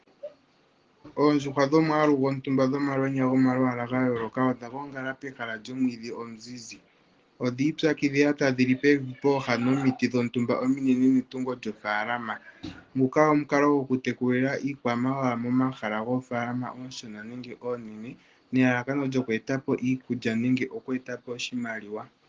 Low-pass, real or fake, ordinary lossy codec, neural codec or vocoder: 7.2 kHz; real; Opus, 16 kbps; none